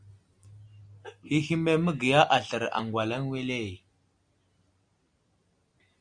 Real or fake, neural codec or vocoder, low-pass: real; none; 9.9 kHz